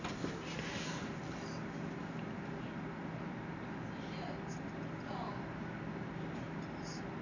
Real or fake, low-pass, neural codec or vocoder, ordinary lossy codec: real; 7.2 kHz; none; none